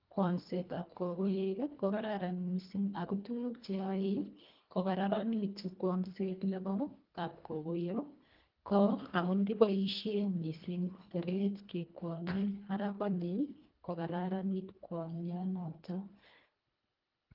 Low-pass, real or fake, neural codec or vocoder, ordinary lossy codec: 5.4 kHz; fake; codec, 24 kHz, 1.5 kbps, HILCodec; Opus, 32 kbps